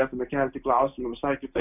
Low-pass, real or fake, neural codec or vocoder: 3.6 kHz; real; none